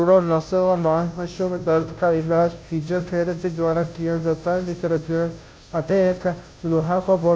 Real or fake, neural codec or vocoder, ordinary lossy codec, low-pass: fake; codec, 16 kHz, 0.5 kbps, FunCodec, trained on Chinese and English, 25 frames a second; none; none